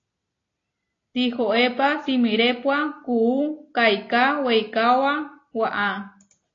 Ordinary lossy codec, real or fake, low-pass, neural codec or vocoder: AAC, 32 kbps; real; 7.2 kHz; none